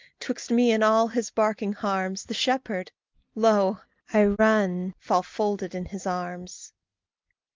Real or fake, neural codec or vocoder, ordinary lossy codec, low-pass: real; none; Opus, 24 kbps; 7.2 kHz